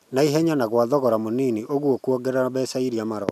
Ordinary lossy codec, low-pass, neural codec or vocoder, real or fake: none; 14.4 kHz; none; real